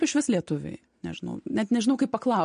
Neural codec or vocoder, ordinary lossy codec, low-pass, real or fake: none; MP3, 48 kbps; 9.9 kHz; real